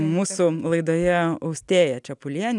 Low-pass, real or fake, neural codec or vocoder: 10.8 kHz; real; none